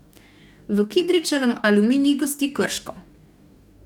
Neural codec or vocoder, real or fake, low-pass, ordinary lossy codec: codec, 44.1 kHz, 2.6 kbps, DAC; fake; 19.8 kHz; none